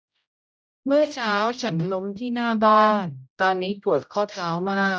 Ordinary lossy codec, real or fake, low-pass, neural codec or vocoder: none; fake; none; codec, 16 kHz, 0.5 kbps, X-Codec, HuBERT features, trained on general audio